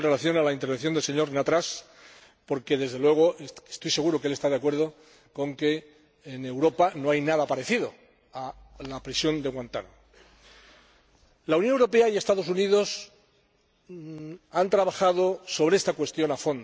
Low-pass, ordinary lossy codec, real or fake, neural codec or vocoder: none; none; real; none